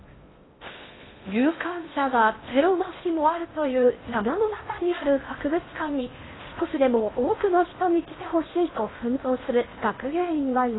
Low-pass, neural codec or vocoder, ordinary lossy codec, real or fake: 7.2 kHz; codec, 16 kHz in and 24 kHz out, 0.6 kbps, FocalCodec, streaming, 2048 codes; AAC, 16 kbps; fake